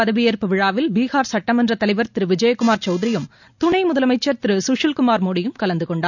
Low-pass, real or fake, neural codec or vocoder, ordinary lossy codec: 7.2 kHz; real; none; none